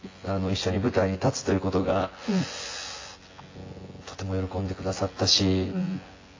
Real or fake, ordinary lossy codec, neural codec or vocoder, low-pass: fake; AAC, 32 kbps; vocoder, 24 kHz, 100 mel bands, Vocos; 7.2 kHz